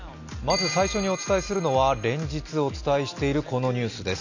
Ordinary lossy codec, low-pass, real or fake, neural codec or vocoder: AAC, 48 kbps; 7.2 kHz; real; none